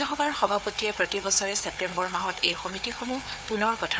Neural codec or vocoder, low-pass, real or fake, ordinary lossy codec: codec, 16 kHz, 4 kbps, FunCodec, trained on Chinese and English, 50 frames a second; none; fake; none